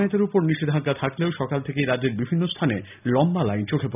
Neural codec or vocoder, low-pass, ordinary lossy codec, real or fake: none; 3.6 kHz; none; real